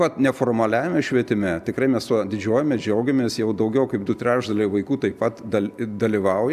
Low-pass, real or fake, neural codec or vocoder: 14.4 kHz; real; none